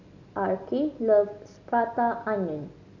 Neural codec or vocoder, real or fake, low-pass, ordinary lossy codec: none; real; 7.2 kHz; none